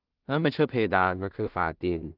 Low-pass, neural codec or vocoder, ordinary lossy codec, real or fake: 5.4 kHz; codec, 16 kHz in and 24 kHz out, 0.4 kbps, LongCat-Audio-Codec, two codebook decoder; Opus, 24 kbps; fake